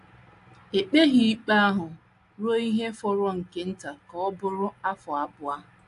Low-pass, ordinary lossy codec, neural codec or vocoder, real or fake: 10.8 kHz; AAC, 64 kbps; none; real